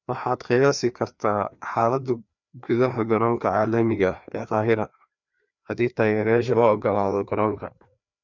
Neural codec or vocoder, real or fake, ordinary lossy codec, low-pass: codec, 16 kHz, 2 kbps, FreqCodec, larger model; fake; none; 7.2 kHz